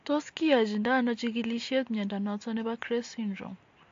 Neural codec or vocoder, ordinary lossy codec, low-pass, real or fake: none; AAC, 48 kbps; 7.2 kHz; real